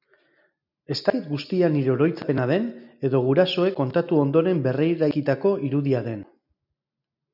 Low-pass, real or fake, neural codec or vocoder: 5.4 kHz; real; none